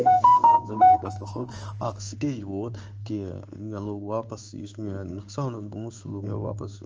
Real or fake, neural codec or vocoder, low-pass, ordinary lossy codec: fake; codec, 16 kHz in and 24 kHz out, 1 kbps, XY-Tokenizer; 7.2 kHz; Opus, 24 kbps